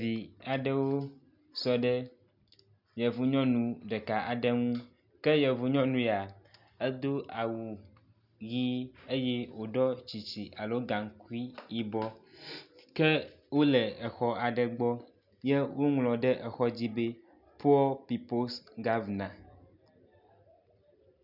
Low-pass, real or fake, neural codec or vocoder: 5.4 kHz; real; none